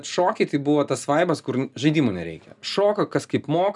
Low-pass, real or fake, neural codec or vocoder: 10.8 kHz; real; none